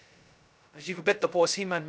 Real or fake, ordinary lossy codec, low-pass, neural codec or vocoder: fake; none; none; codec, 16 kHz, 0.2 kbps, FocalCodec